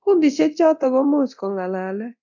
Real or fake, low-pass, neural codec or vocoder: fake; 7.2 kHz; codec, 24 kHz, 0.9 kbps, DualCodec